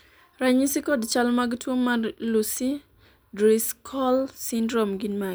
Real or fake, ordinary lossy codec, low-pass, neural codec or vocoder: real; none; none; none